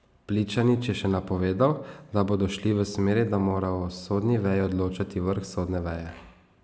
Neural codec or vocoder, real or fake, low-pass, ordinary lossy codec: none; real; none; none